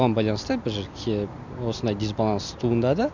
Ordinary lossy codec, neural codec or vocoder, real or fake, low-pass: none; none; real; 7.2 kHz